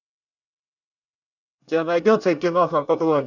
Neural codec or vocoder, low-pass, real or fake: codec, 24 kHz, 1 kbps, SNAC; 7.2 kHz; fake